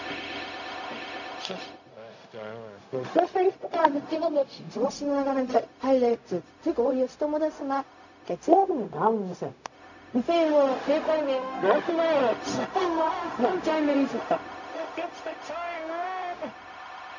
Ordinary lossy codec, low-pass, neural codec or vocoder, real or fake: none; 7.2 kHz; codec, 16 kHz, 0.4 kbps, LongCat-Audio-Codec; fake